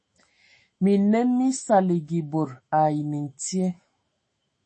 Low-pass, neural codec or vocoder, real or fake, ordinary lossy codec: 10.8 kHz; autoencoder, 48 kHz, 128 numbers a frame, DAC-VAE, trained on Japanese speech; fake; MP3, 32 kbps